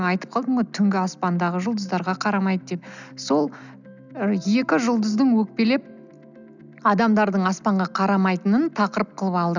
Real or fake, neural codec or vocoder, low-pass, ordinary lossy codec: real; none; 7.2 kHz; none